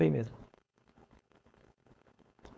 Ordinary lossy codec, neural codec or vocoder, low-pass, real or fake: none; codec, 16 kHz, 4.8 kbps, FACodec; none; fake